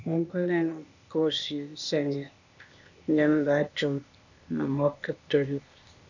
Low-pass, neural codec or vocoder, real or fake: 7.2 kHz; codec, 16 kHz, 0.8 kbps, ZipCodec; fake